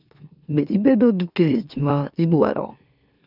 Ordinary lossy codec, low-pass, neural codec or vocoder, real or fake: none; 5.4 kHz; autoencoder, 44.1 kHz, a latent of 192 numbers a frame, MeloTTS; fake